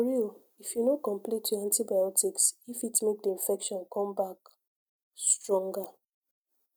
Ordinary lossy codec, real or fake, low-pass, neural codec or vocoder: none; real; none; none